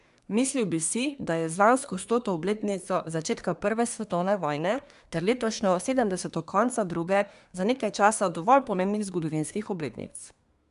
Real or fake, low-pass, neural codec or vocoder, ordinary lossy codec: fake; 10.8 kHz; codec, 24 kHz, 1 kbps, SNAC; none